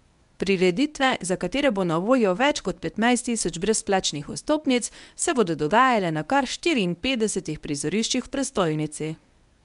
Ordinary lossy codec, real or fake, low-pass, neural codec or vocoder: MP3, 96 kbps; fake; 10.8 kHz; codec, 24 kHz, 0.9 kbps, WavTokenizer, medium speech release version 1